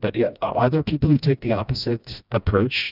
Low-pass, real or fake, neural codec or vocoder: 5.4 kHz; fake; codec, 16 kHz, 1 kbps, FreqCodec, smaller model